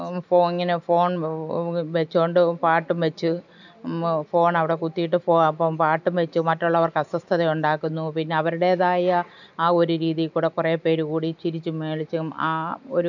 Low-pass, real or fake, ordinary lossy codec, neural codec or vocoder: 7.2 kHz; real; none; none